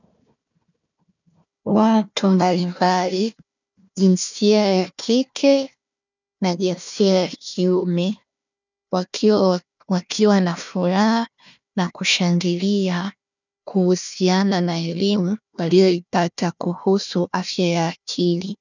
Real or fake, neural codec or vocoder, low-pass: fake; codec, 16 kHz, 1 kbps, FunCodec, trained on Chinese and English, 50 frames a second; 7.2 kHz